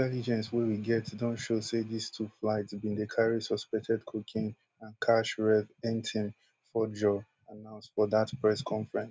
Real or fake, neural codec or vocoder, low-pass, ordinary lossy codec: real; none; none; none